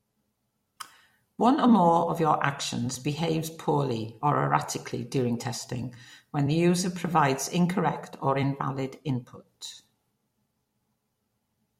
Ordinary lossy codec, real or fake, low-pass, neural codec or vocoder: MP3, 64 kbps; fake; 19.8 kHz; vocoder, 44.1 kHz, 128 mel bands every 256 samples, BigVGAN v2